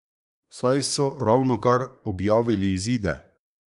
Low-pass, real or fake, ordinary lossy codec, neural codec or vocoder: 10.8 kHz; fake; none; codec, 24 kHz, 1 kbps, SNAC